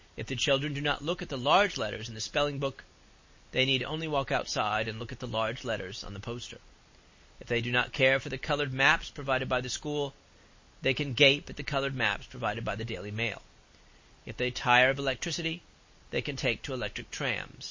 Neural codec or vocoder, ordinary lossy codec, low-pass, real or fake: none; MP3, 32 kbps; 7.2 kHz; real